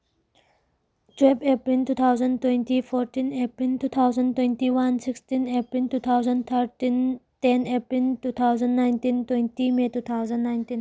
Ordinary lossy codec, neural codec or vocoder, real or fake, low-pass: none; none; real; none